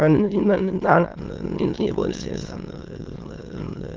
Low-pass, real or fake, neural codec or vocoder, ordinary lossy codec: 7.2 kHz; fake; autoencoder, 22.05 kHz, a latent of 192 numbers a frame, VITS, trained on many speakers; Opus, 16 kbps